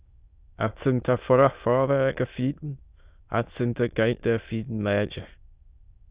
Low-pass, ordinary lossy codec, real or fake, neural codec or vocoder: 3.6 kHz; Opus, 64 kbps; fake; autoencoder, 22.05 kHz, a latent of 192 numbers a frame, VITS, trained on many speakers